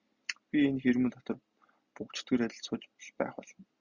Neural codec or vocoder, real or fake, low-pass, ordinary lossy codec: none; real; 7.2 kHz; Opus, 64 kbps